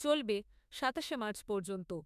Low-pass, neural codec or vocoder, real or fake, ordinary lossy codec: 14.4 kHz; autoencoder, 48 kHz, 32 numbers a frame, DAC-VAE, trained on Japanese speech; fake; none